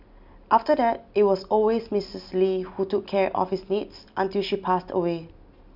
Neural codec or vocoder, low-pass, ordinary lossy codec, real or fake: none; 5.4 kHz; none; real